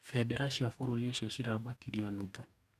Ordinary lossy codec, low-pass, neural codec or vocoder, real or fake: none; 14.4 kHz; codec, 44.1 kHz, 2.6 kbps, DAC; fake